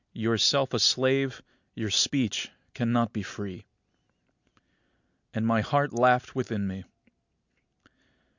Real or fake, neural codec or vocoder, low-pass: real; none; 7.2 kHz